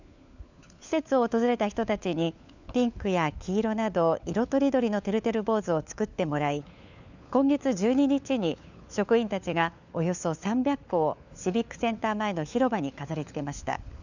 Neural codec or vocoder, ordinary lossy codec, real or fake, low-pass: codec, 16 kHz, 4 kbps, FunCodec, trained on LibriTTS, 50 frames a second; none; fake; 7.2 kHz